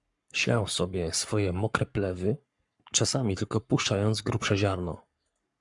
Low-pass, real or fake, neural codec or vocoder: 10.8 kHz; fake; codec, 44.1 kHz, 7.8 kbps, Pupu-Codec